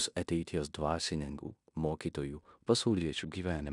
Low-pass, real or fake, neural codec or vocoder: 10.8 kHz; fake; codec, 16 kHz in and 24 kHz out, 0.9 kbps, LongCat-Audio-Codec, fine tuned four codebook decoder